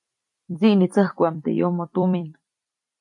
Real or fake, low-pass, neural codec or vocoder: fake; 10.8 kHz; vocoder, 44.1 kHz, 128 mel bands every 256 samples, BigVGAN v2